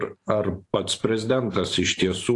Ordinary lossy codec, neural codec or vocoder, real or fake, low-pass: AAC, 48 kbps; vocoder, 44.1 kHz, 128 mel bands every 512 samples, BigVGAN v2; fake; 10.8 kHz